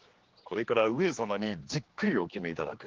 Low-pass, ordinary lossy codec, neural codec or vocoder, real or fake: 7.2 kHz; Opus, 16 kbps; codec, 16 kHz, 2 kbps, X-Codec, HuBERT features, trained on general audio; fake